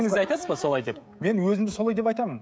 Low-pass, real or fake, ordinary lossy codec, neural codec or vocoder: none; fake; none; codec, 16 kHz, 16 kbps, FreqCodec, smaller model